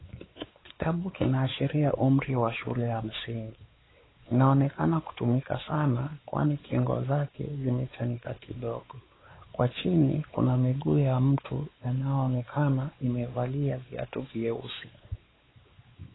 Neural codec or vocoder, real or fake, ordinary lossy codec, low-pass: codec, 16 kHz, 4 kbps, X-Codec, WavLM features, trained on Multilingual LibriSpeech; fake; AAC, 16 kbps; 7.2 kHz